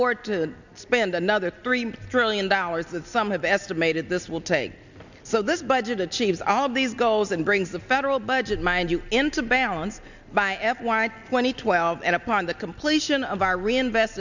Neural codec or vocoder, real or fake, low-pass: none; real; 7.2 kHz